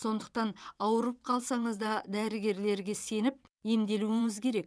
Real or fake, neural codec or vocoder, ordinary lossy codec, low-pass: fake; vocoder, 22.05 kHz, 80 mel bands, WaveNeXt; none; none